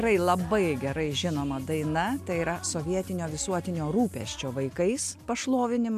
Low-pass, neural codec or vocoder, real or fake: 14.4 kHz; none; real